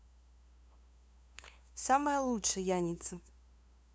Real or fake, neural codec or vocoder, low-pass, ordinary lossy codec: fake; codec, 16 kHz, 2 kbps, FunCodec, trained on LibriTTS, 25 frames a second; none; none